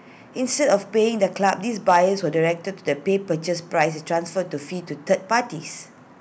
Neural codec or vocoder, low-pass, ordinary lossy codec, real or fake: none; none; none; real